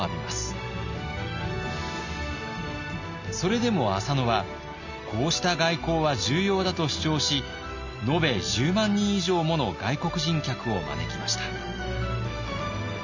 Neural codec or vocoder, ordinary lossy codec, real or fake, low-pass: none; none; real; 7.2 kHz